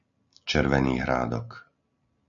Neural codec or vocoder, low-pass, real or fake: none; 7.2 kHz; real